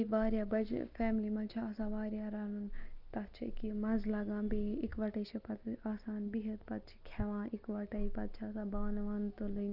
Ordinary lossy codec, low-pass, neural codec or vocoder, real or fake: Opus, 32 kbps; 5.4 kHz; none; real